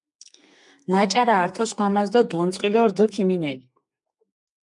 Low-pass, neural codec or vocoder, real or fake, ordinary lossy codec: 10.8 kHz; codec, 32 kHz, 1.9 kbps, SNAC; fake; MP3, 96 kbps